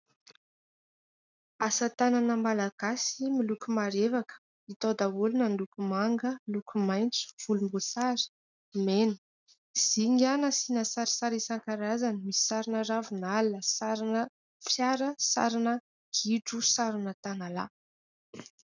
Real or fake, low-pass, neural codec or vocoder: real; 7.2 kHz; none